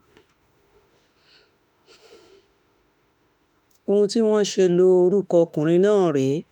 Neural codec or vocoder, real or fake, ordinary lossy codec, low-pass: autoencoder, 48 kHz, 32 numbers a frame, DAC-VAE, trained on Japanese speech; fake; none; 19.8 kHz